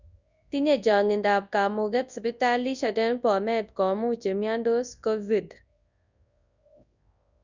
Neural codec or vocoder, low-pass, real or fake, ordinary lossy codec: codec, 24 kHz, 0.9 kbps, WavTokenizer, large speech release; 7.2 kHz; fake; Opus, 64 kbps